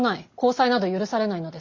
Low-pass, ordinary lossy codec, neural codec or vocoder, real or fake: 7.2 kHz; Opus, 64 kbps; none; real